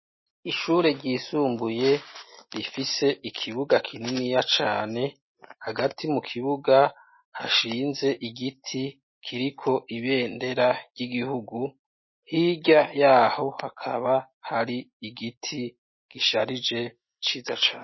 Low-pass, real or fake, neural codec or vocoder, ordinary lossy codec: 7.2 kHz; real; none; MP3, 24 kbps